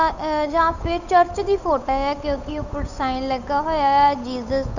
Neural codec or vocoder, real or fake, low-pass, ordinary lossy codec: codec, 16 kHz, 8 kbps, FunCodec, trained on Chinese and English, 25 frames a second; fake; 7.2 kHz; none